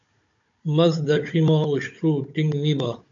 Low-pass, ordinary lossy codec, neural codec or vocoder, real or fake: 7.2 kHz; AAC, 64 kbps; codec, 16 kHz, 16 kbps, FunCodec, trained on Chinese and English, 50 frames a second; fake